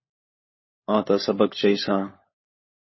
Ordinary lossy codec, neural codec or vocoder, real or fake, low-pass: MP3, 24 kbps; codec, 16 kHz, 16 kbps, FunCodec, trained on LibriTTS, 50 frames a second; fake; 7.2 kHz